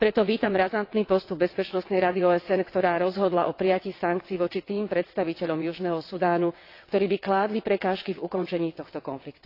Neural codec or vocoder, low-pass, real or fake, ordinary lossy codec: vocoder, 22.05 kHz, 80 mel bands, WaveNeXt; 5.4 kHz; fake; AAC, 32 kbps